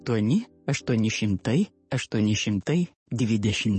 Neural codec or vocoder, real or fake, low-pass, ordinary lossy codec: codec, 44.1 kHz, 7.8 kbps, DAC; fake; 10.8 kHz; MP3, 32 kbps